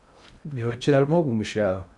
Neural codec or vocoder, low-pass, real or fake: codec, 16 kHz in and 24 kHz out, 0.6 kbps, FocalCodec, streaming, 2048 codes; 10.8 kHz; fake